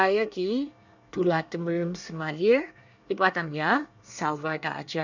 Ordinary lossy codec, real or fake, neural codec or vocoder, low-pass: none; fake; codec, 24 kHz, 1 kbps, SNAC; 7.2 kHz